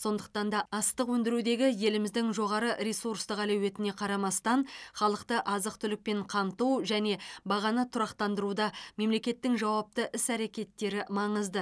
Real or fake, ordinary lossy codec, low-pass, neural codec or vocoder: real; none; none; none